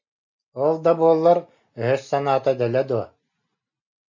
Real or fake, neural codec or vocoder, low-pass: real; none; 7.2 kHz